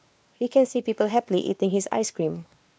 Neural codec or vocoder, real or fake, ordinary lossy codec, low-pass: codec, 16 kHz, 2 kbps, X-Codec, WavLM features, trained on Multilingual LibriSpeech; fake; none; none